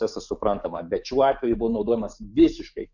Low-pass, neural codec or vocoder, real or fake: 7.2 kHz; codec, 44.1 kHz, 7.8 kbps, DAC; fake